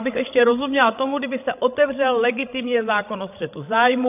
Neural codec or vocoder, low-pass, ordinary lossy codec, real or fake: codec, 16 kHz, 16 kbps, FreqCodec, larger model; 3.6 kHz; AAC, 32 kbps; fake